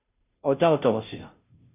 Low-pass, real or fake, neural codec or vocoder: 3.6 kHz; fake; codec, 16 kHz, 0.5 kbps, FunCodec, trained on Chinese and English, 25 frames a second